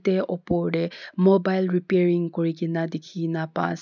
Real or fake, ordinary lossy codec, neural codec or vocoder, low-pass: real; none; none; 7.2 kHz